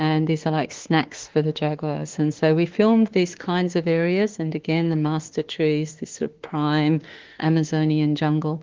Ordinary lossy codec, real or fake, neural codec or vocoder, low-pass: Opus, 16 kbps; fake; autoencoder, 48 kHz, 32 numbers a frame, DAC-VAE, trained on Japanese speech; 7.2 kHz